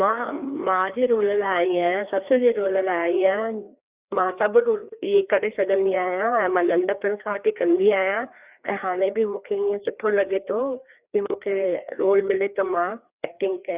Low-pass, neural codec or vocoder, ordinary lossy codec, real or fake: 3.6 kHz; codec, 16 kHz, 2 kbps, FreqCodec, larger model; Opus, 64 kbps; fake